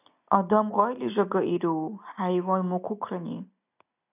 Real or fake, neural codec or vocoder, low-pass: real; none; 3.6 kHz